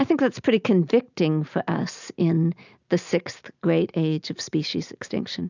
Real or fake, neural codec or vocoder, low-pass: real; none; 7.2 kHz